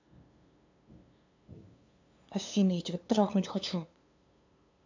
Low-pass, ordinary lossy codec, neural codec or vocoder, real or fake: 7.2 kHz; AAC, 48 kbps; codec, 16 kHz, 2 kbps, FunCodec, trained on LibriTTS, 25 frames a second; fake